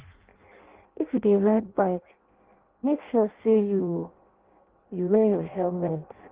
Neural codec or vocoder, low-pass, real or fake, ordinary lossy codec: codec, 16 kHz in and 24 kHz out, 0.6 kbps, FireRedTTS-2 codec; 3.6 kHz; fake; Opus, 16 kbps